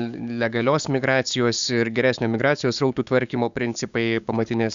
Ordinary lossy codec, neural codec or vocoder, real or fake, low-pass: Opus, 64 kbps; codec, 16 kHz, 6 kbps, DAC; fake; 7.2 kHz